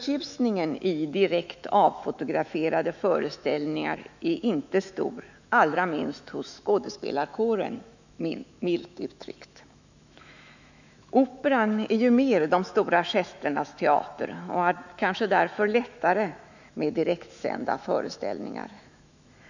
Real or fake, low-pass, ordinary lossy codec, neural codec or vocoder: fake; 7.2 kHz; none; autoencoder, 48 kHz, 128 numbers a frame, DAC-VAE, trained on Japanese speech